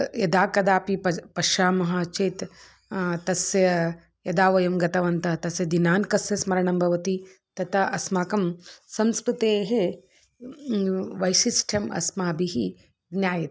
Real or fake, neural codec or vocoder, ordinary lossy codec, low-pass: real; none; none; none